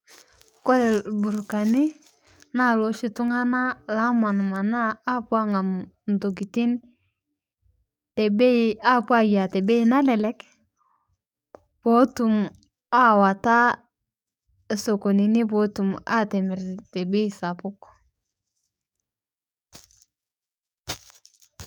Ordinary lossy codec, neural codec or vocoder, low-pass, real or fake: none; codec, 44.1 kHz, 7.8 kbps, DAC; 19.8 kHz; fake